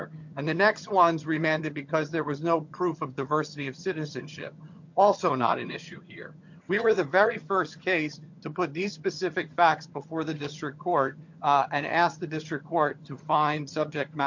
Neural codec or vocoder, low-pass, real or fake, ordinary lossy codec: vocoder, 22.05 kHz, 80 mel bands, HiFi-GAN; 7.2 kHz; fake; MP3, 48 kbps